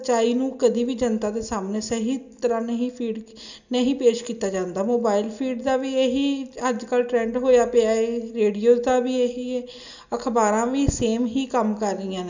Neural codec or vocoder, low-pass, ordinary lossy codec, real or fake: none; 7.2 kHz; none; real